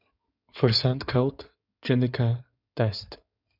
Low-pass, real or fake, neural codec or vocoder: 5.4 kHz; fake; codec, 16 kHz in and 24 kHz out, 2.2 kbps, FireRedTTS-2 codec